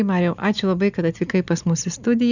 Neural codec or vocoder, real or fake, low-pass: none; real; 7.2 kHz